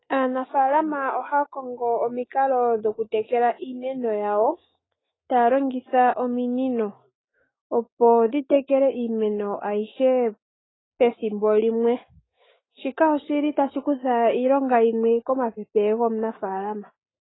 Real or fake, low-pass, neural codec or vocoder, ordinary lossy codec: fake; 7.2 kHz; autoencoder, 48 kHz, 128 numbers a frame, DAC-VAE, trained on Japanese speech; AAC, 16 kbps